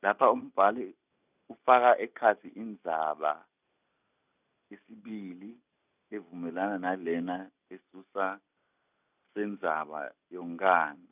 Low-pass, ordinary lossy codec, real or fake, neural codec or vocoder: 3.6 kHz; none; real; none